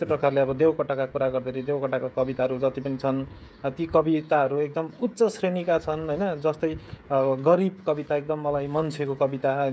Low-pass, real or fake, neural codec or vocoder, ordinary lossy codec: none; fake; codec, 16 kHz, 16 kbps, FreqCodec, smaller model; none